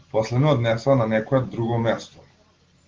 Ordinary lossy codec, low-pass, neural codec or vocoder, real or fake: Opus, 16 kbps; 7.2 kHz; none; real